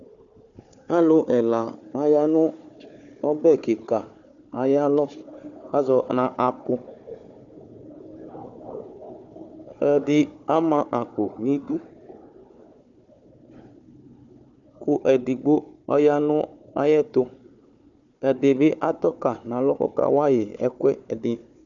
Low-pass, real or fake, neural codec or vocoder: 7.2 kHz; fake; codec, 16 kHz, 4 kbps, FunCodec, trained on Chinese and English, 50 frames a second